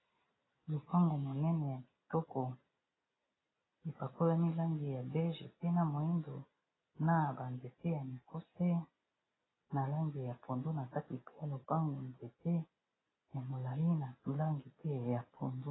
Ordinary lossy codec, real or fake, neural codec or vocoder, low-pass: AAC, 16 kbps; real; none; 7.2 kHz